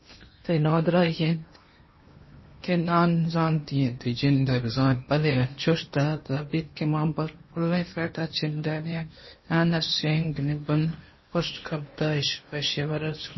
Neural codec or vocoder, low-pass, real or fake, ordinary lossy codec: codec, 16 kHz in and 24 kHz out, 0.8 kbps, FocalCodec, streaming, 65536 codes; 7.2 kHz; fake; MP3, 24 kbps